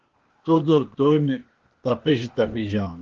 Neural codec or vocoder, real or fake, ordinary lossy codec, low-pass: codec, 16 kHz, 0.8 kbps, ZipCodec; fake; Opus, 16 kbps; 7.2 kHz